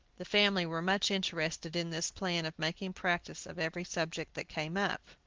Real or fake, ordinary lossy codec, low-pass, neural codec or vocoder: real; Opus, 16 kbps; 7.2 kHz; none